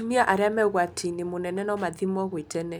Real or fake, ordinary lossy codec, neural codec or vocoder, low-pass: real; none; none; none